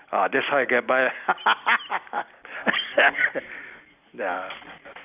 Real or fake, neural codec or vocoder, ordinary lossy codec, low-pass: real; none; none; 3.6 kHz